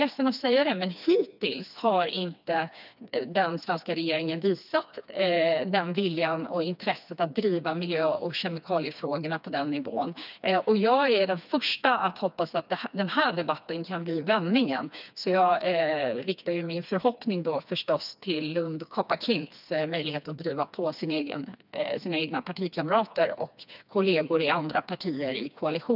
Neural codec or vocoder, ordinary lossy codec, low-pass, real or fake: codec, 16 kHz, 2 kbps, FreqCodec, smaller model; none; 5.4 kHz; fake